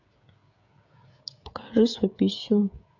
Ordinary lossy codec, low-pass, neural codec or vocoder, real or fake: none; 7.2 kHz; codec, 16 kHz, 16 kbps, FreqCodec, smaller model; fake